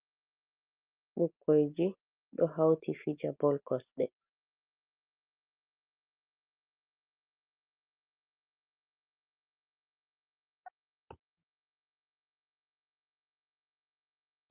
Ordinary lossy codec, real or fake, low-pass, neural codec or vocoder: Opus, 24 kbps; real; 3.6 kHz; none